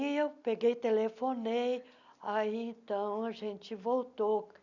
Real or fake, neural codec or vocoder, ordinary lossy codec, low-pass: real; none; none; 7.2 kHz